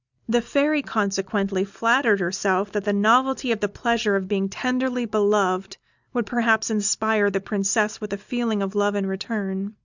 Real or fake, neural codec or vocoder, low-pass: real; none; 7.2 kHz